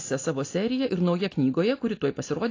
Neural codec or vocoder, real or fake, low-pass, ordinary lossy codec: none; real; 7.2 kHz; AAC, 32 kbps